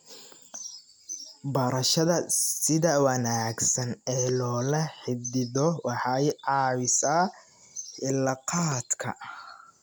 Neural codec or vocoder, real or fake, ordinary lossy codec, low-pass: none; real; none; none